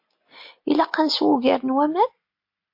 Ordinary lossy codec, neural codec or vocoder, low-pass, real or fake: MP3, 32 kbps; none; 5.4 kHz; real